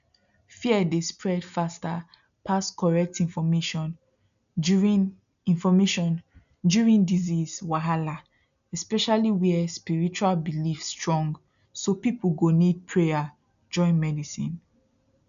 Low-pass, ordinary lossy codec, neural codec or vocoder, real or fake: 7.2 kHz; none; none; real